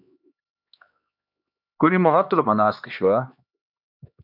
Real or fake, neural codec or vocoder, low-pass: fake; codec, 16 kHz, 2 kbps, X-Codec, HuBERT features, trained on LibriSpeech; 5.4 kHz